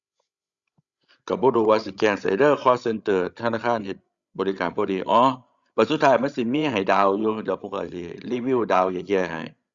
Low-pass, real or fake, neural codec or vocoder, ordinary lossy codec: 7.2 kHz; fake; codec, 16 kHz, 16 kbps, FreqCodec, larger model; Opus, 64 kbps